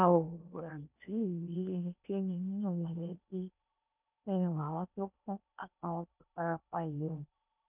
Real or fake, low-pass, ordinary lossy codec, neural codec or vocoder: fake; 3.6 kHz; none; codec, 16 kHz in and 24 kHz out, 0.8 kbps, FocalCodec, streaming, 65536 codes